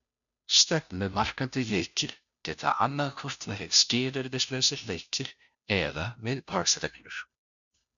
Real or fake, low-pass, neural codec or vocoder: fake; 7.2 kHz; codec, 16 kHz, 0.5 kbps, FunCodec, trained on Chinese and English, 25 frames a second